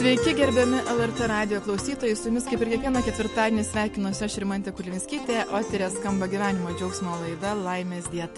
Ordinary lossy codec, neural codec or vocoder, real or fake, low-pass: MP3, 48 kbps; none; real; 14.4 kHz